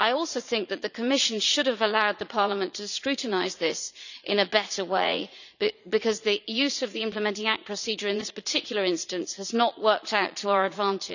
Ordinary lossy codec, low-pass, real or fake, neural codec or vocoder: none; 7.2 kHz; fake; vocoder, 44.1 kHz, 80 mel bands, Vocos